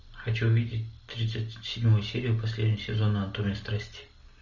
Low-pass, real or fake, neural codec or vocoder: 7.2 kHz; real; none